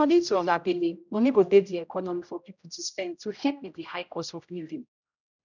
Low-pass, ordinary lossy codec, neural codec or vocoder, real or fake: 7.2 kHz; none; codec, 16 kHz, 0.5 kbps, X-Codec, HuBERT features, trained on general audio; fake